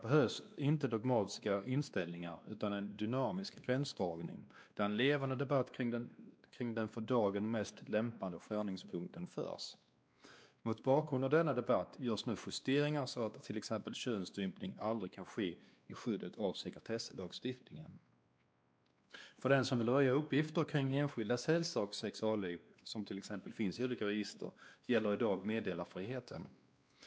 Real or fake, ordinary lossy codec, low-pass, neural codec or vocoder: fake; none; none; codec, 16 kHz, 2 kbps, X-Codec, WavLM features, trained on Multilingual LibriSpeech